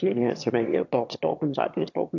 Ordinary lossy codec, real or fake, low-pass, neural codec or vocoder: AAC, 48 kbps; fake; 7.2 kHz; autoencoder, 22.05 kHz, a latent of 192 numbers a frame, VITS, trained on one speaker